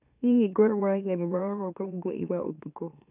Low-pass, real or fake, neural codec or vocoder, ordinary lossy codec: 3.6 kHz; fake; autoencoder, 44.1 kHz, a latent of 192 numbers a frame, MeloTTS; none